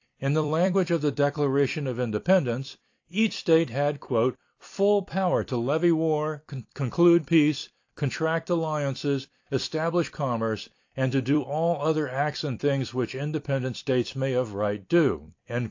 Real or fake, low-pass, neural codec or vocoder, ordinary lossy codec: fake; 7.2 kHz; vocoder, 44.1 kHz, 128 mel bands every 256 samples, BigVGAN v2; AAC, 48 kbps